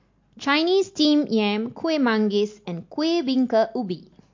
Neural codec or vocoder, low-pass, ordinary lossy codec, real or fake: none; 7.2 kHz; MP3, 48 kbps; real